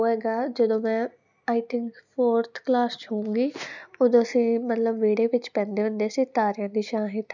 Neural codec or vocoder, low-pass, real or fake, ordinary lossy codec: none; 7.2 kHz; real; none